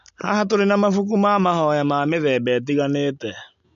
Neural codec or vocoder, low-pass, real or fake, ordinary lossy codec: none; 7.2 kHz; real; MP3, 64 kbps